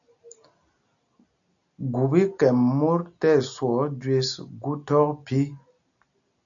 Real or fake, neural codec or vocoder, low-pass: real; none; 7.2 kHz